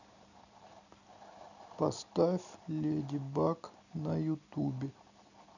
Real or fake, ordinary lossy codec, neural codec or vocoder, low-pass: fake; none; vocoder, 44.1 kHz, 128 mel bands every 256 samples, BigVGAN v2; 7.2 kHz